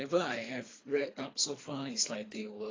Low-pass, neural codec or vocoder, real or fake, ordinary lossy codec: 7.2 kHz; codec, 24 kHz, 3 kbps, HILCodec; fake; AAC, 32 kbps